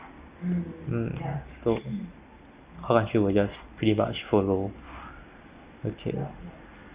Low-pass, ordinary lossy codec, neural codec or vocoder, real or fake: 3.6 kHz; Opus, 64 kbps; none; real